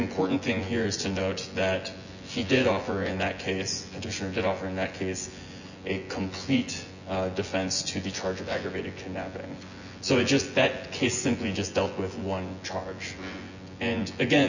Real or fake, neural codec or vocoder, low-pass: fake; vocoder, 24 kHz, 100 mel bands, Vocos; 7.2 kHz